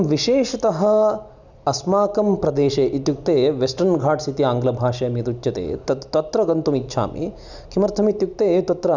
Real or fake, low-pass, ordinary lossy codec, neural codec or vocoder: real; 7.2 kHz; none; none